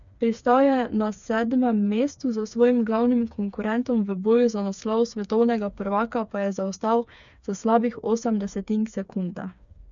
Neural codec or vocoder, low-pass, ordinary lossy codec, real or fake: codec, 16 kHz, 4 kbps, FreqCodec, smaller model; 7.2 kHz; none; fake